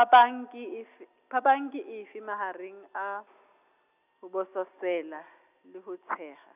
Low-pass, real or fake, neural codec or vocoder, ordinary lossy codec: 3.6 kHz; real; none; none